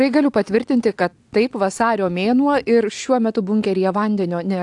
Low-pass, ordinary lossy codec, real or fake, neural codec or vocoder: 10.8 kHz; AAC, 64 kbps; real; none